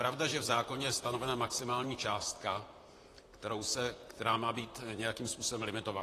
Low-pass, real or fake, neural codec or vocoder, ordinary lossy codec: 14.4 kHz; fake; vocoder, 44.1 kHz, 128 mel bands, Pupu-Vocoder; AAC, 48 kbps